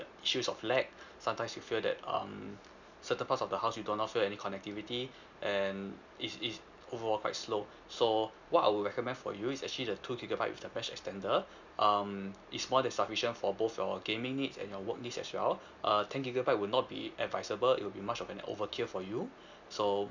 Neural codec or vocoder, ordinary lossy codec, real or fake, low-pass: none; none; real; 7.2 kHz